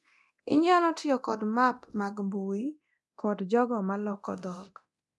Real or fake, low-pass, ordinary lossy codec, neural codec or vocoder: fake; none; none; codec, 24 kHz, 0.9 kbps, DualCodec